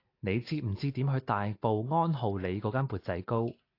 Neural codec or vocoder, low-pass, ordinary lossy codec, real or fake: none; 5.4 kHz; AAC, 32 kbps; real